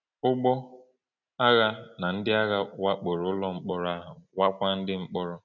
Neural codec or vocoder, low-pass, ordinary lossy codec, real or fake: none; 7.2 kHz; none; real